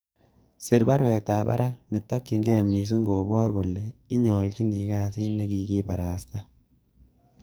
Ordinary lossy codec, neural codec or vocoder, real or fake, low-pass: none; codec, 44.1 kHz, 2.6 kbps, SNAC; fake; none